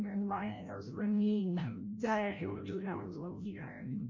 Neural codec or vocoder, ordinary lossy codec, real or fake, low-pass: codec, 16 kHz, 0.5 kbps, FreqCodec, larger model; none; fake; 7.2 kHz